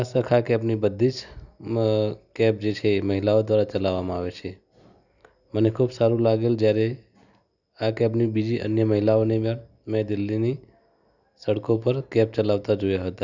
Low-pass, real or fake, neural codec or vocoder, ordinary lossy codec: 7.2 kHz; real; none; none